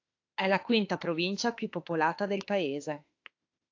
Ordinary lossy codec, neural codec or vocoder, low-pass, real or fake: AAC, 48 kbps; autoencoder, 48 kHz, 32 numbers a frame, DAC-VAE, trained on Japanese speech; 7.2 kHz; fake